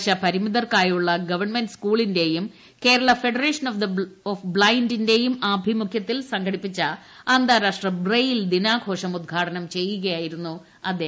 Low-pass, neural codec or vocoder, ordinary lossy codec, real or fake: none; none; none; real